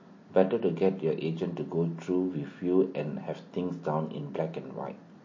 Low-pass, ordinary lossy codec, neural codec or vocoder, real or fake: 7.2 kHz; MP3, 32 kbps; none; real